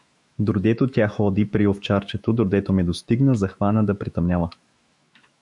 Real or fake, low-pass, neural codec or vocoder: fake; 10.8 kHz; autoencoder, 48 kHz, 128 numbers a frame, DAC-VAE, trained on Japanese speech